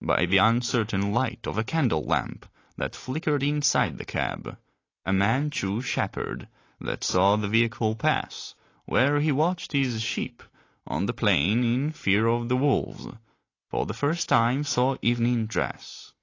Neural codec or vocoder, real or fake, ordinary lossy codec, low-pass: none; real; AAC, 32 kbps; 7.2 kHz